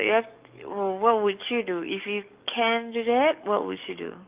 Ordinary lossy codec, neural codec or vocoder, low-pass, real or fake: Opus, 24 kbps; codec, 44.1 kHz, 7.8 kbps, DAC; 3.6 kHz; fake